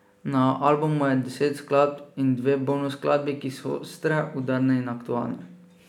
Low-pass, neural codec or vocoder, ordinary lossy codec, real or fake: 19.8 kHz; none; none; real